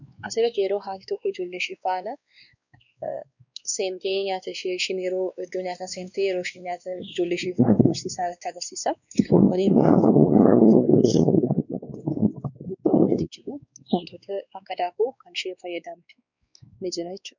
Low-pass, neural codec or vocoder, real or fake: 7.2 kHz; codec, 16 kHz, 2 kbps, X-Codec, WavLM features, trained on Multilingual LibriSpeech; fake